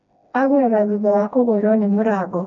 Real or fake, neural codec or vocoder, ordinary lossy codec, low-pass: fake; codec, 16 kHz, 1 kbps, FreqCodec, smaller model; none; 7.2 kHz